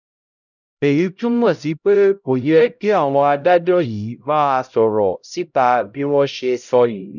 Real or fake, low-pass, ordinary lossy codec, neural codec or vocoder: fake; 7.2 kHz; none; codec, 16 kHz, 0.5 kbps, X-Codec, HuBERT features, trained on LibriSpeech